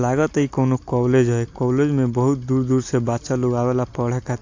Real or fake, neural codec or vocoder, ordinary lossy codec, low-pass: real; none; AAC, 48 kbps; 7.2 kHz